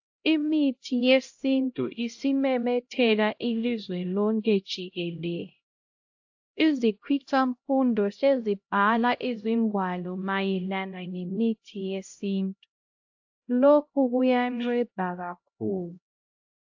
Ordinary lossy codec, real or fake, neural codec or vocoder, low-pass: AAC, 48 kbps; fake; codec, 16 kHz, 0.5 kbps, X-Codec, HuBERT features, trained on LibriSpeech; 7.2 kHz